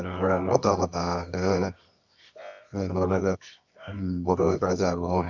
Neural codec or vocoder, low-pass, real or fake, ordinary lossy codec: codec, 24 kHz, 0.9 kbps, WavTokenizer, medium music audio release; 7.2 kHz; fake; none